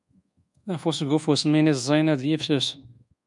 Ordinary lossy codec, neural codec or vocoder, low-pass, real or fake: MP3, 96 kbps; codec, 24 kHz, 1.2 kbps, DualCodec; 10.8 kHz; fake